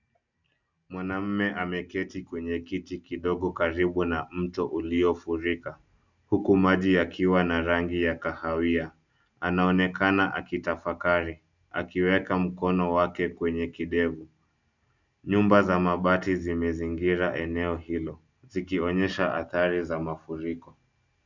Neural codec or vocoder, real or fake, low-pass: none; real; 7.2 kHz